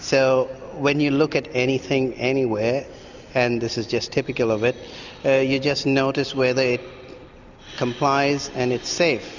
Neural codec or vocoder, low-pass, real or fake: none; 7.2 kHz; real